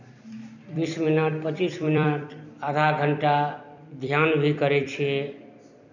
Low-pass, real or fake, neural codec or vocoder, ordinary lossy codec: 7.2 kHz; fake; vocoder, 44.1 kHz, 128 mel bands every 256 samples, BigVGAN v2; none